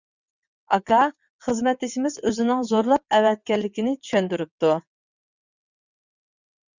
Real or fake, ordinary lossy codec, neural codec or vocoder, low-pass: fake; Opus, 64 kbps; vocoder, 22.05 kHz, 80 mel bands, WaveNeXt; 7.2 kHz